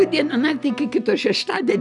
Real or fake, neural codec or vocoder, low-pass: real; none; 10.8 kHz